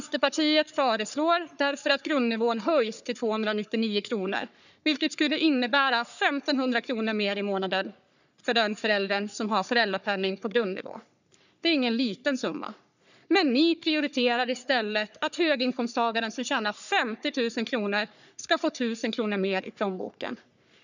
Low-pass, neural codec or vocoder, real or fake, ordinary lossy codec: 7.2 kHz; codec, 44.1 kHz, 3.4 kbps, Pupu-Codec; fake; none